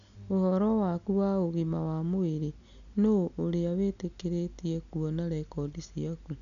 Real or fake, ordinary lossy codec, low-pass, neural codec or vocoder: real; none; 7.2 kHz; none